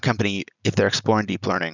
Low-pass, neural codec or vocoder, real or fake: 7.2 kHz; none; real